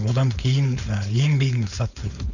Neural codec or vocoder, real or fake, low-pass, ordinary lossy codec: codec, 16 kHz, 4.8 kbps, FACodec; fake; 7.2 kHz; none